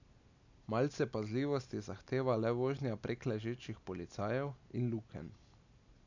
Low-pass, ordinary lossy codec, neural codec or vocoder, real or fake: 7.2 kHz; none; none; real